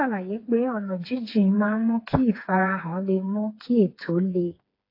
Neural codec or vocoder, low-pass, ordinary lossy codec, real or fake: codec, 16 kHz, 4 kbps, FreqCodec, smaller model; 5.4 kHz; AAC, 32 kbps; fake